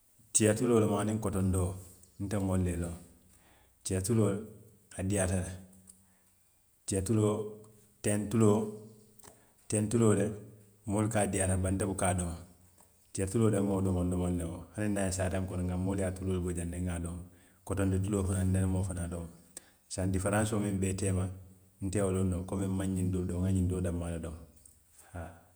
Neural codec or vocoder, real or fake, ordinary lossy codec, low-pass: vocoder, 48 kHz, 128 mel bands, Vocos; fake; none; none